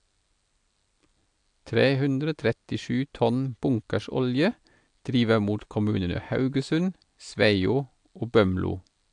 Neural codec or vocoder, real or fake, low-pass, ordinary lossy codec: none; real; 9.9 kHz; AAC, 64 kbps